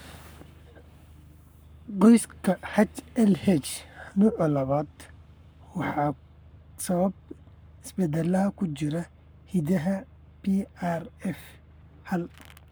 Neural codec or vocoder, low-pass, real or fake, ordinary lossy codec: codec, 44.1 kHz, 7.8 kbps, Pupu-Codec; none; fake; none